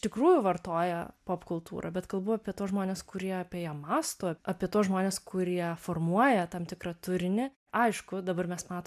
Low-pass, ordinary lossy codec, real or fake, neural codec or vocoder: 14.4 kHz; MP3, 96 kbps; real; none